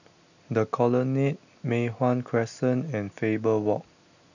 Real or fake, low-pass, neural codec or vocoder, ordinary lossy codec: real; 7.2 kHz; none; none